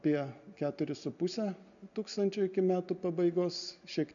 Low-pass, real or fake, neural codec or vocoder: 7.2 kHz; real; none